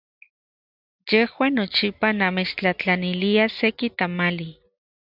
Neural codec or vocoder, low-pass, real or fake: none; 5.4 kHz; real